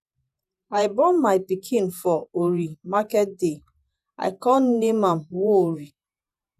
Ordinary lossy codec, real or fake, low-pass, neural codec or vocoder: none; fake; 14.4 kHz; vocoder, 48 kHz, 128 mel bands, Vocos